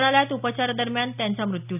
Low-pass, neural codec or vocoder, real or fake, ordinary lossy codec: 3.6 kHz; none; real; none